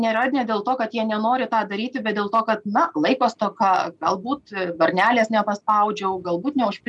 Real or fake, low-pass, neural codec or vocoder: real; 10.8 kHz; none